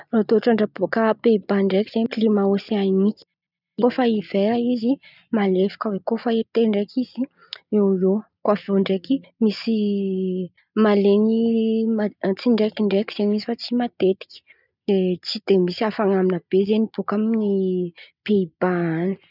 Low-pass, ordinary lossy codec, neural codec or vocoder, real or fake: 5.4 kHz; none; none; real